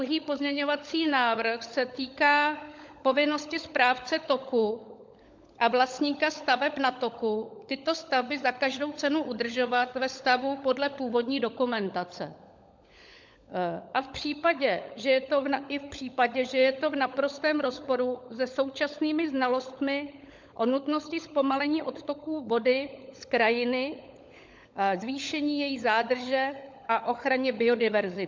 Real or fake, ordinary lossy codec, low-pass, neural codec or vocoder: fake; MP3, 64 kbps; 7.2 kHz; codec, 16 kHz, 16 kbps, FunCodec, trained on LibriTTS, 50 frames a second